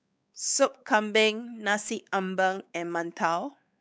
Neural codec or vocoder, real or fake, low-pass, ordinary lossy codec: codec, 16 kHz, 4 kbps, X-Codec, WavLM features, trained on Multilingual LibriSpeech; fake; none; none